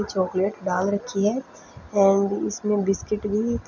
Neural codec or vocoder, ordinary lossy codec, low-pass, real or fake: none; none; 7.2 kHz; real